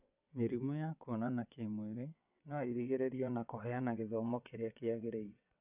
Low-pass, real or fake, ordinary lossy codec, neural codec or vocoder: 3.6 kHz; fake; none; vocoder, 22.05 kHz, 80 mel bands, WaveNeXt